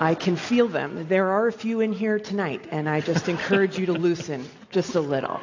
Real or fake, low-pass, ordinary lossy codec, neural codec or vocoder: real; 7.2 kHz; AAC, 48 kbps; none